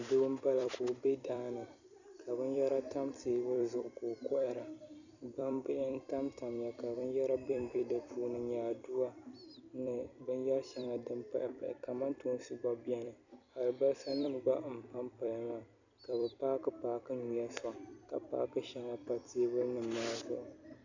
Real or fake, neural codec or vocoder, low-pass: fake; vocoder, 44.1 kHz, 128 mel bands every 256 samples, BigVGAN v2; 7.2 kHz